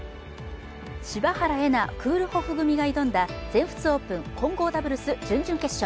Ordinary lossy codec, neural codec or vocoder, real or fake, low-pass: none; none; real; none